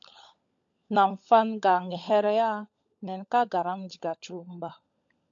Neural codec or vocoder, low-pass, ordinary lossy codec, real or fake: codec, 16 kHz, 16 kbps, FunCodec, trained on LibriTTS, 50 frames a second; 7.2 kHz; AAC, 64 kbps; fake